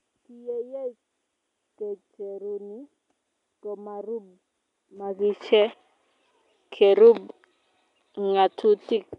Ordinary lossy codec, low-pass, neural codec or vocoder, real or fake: none; 10.8 kHz; none; real